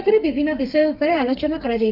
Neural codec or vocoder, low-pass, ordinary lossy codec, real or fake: codec, 32 kHz, 1.9 kbps, SNAC; 5.4 kHz; none; fake